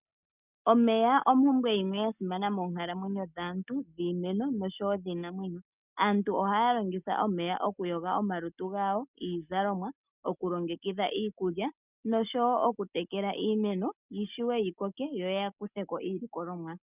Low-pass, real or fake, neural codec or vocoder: 3.6 kHz; real; none